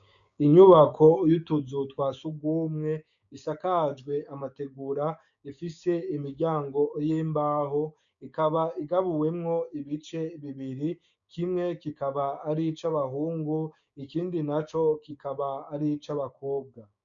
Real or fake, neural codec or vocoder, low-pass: fake; codec, 16 kHz, 6 kbps, DAC; 7.2 kHz